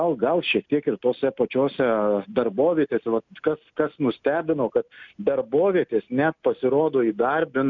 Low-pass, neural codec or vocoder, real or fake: 7.2 kHz; none; real